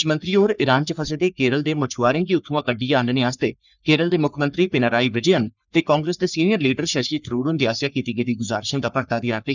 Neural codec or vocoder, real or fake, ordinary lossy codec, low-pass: codec, 44.1 kHz, 3.4 kbps, Pupu-Codec; fake; none; 7.2 kHz